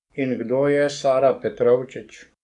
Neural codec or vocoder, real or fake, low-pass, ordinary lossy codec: codec, 44.1 kHz, 7.8 kbps, DAC; fake; 10.8 kHz; none